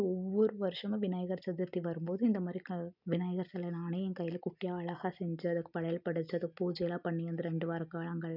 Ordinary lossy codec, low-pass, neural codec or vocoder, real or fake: none; 5.4 kHz; none; real